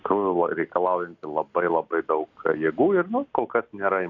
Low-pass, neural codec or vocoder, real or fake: 7.2 kHz; none; real